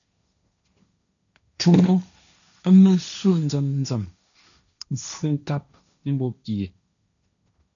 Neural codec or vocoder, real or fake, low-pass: codec, 16 kHz, 1.1 kbps, Voila-Tokenizer; fake; 7.2 kHz